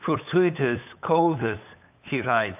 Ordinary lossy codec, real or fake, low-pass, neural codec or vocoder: AAC, 32 kbps; fake; 3.6 kHz; codec, 16 kHz, 8 kbps, FunCodec, trained on LibriTTS, 25 frames a second